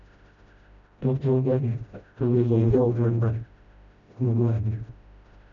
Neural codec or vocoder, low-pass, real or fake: codec, 16 kHz, 0.5 kbps, FreqCodec, smaller model; 7.2 kHz; fake